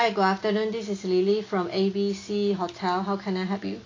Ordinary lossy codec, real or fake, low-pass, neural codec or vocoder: AAC, 32 kbps; real; 7.2 kHz; none